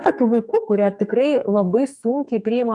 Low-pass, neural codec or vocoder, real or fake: 10.8 kHz; codec, 44.1 kHz, 2.6 kbps, SNAC; fake